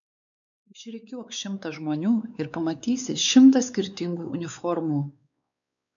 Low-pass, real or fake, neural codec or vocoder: 7.2 kHz; fake; codec, 16 kHz, 4 kbps, X-Codec, WavLM features, trained on Multilingual LibriSpeech